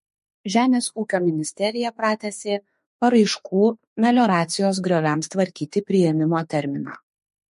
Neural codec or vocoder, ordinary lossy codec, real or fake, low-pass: autoencoder, 48 kHz, 32 numbers a frame, DAC-VAE, trained on Japanese speech; MP3, 48 kbps; fake; 14.4 kHz